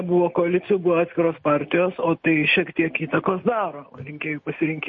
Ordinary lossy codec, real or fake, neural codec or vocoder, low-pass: MP3, 32 kbps; real; none; 7.2 kHz